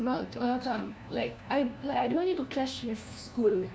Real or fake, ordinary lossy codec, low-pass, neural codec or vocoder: fake; none; none; codec, 16 kHz, 1 kbps, FunCodec, trained on LibriTTS, 50 frames a second